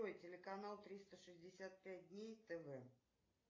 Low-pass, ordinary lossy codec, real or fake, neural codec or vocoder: 7.2 kHz; AAC, 32 kbps; real; none